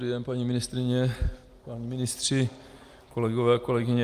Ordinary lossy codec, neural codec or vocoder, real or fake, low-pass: Opus, 32 kbps; none; real; 14.4 kHz